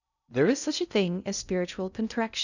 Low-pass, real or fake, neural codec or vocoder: 7.2 kHz; fake; codec, 16 kHz in and 24 kHz out, 0.6 kbps, FocalCodec, streaming, 2048 codes